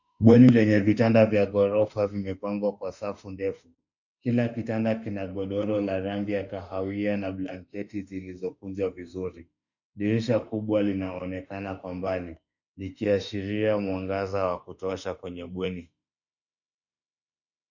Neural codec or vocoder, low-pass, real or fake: autoencoder, 48 kHz, 32 numbers a frame, DAC-VAE, trained on Japanese speech; 7.2 kHz; fake